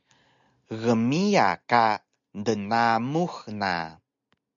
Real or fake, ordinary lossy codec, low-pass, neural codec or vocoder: real; MP3, 96 kbps; 7.2 kHz; none